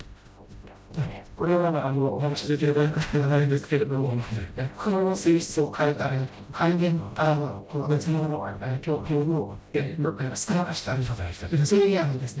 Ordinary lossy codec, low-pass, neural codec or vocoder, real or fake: none; none; codec, 16 kHz, 0.5 kbps, FreqCodec, smaller model; fake